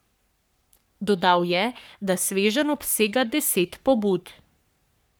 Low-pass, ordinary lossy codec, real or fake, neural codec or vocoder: none; none; fake; codec, 44.1 kHz, 3.4 kbps, Pupu-Codec